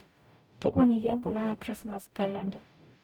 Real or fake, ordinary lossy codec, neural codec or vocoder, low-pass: fake; none; codec, 44.1 kHz, 0.9 kbps, DAC; 19.8 kHz